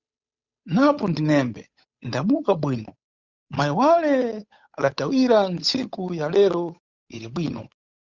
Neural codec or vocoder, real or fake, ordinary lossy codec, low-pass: codec, 16 kHz, 8 kbps, FunCodec, trained on Chinese and English, 25 frames a second; fake; Opus, 64 kbps; 7.2 kHz